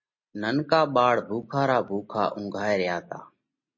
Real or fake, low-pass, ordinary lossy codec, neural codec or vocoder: real; 7.2 kHz; MP3, 32 kbps; none